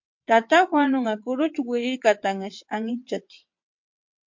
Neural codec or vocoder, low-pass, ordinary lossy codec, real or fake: vocoder, 22.05 kHz, 80 mel bands, WaveNeXt; 7.2 kHz; MP3, 48 kbps; fake